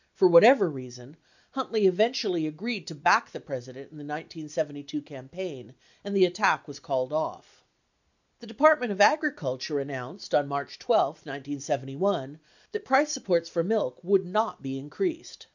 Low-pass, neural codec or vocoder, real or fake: 7.2 kHz; none; real